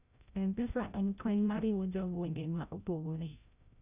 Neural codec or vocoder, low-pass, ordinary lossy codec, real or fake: codec, 16 kHz, 0.5 kbps, FreqCodec, larger model; 3.6 kHz; none; fake